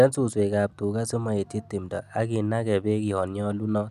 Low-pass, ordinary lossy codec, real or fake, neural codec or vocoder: 14.4 kHz; none; real; none